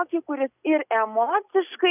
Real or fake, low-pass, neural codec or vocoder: real; 3.6 kHz; none